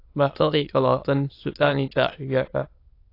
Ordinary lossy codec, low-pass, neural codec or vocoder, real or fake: AAC, 32 kbps; 5.4 kHz; autoencoder, 22.05 kHz, a latent of 192 numbers a frame, VITS, trained on many speakers; fake